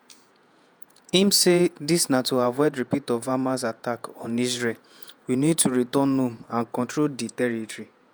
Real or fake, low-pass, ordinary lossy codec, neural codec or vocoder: fake; none; none; vocoder, 48 kHz, 128 mel bands, Vocos